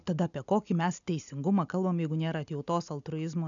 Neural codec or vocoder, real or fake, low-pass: none; real; 7.2 kHz